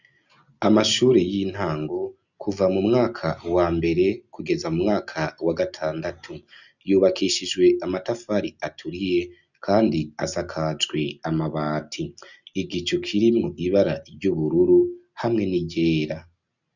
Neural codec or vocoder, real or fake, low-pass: none; real; 7.2 kHz